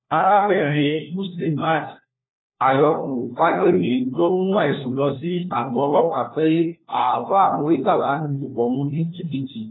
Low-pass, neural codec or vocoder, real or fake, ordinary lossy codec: 7.2 kHz; codec, 16 kHz, 1 kbps, FunCodec, trained on LibriTTS, 50 frames a second; fake; AAC, 16 kbps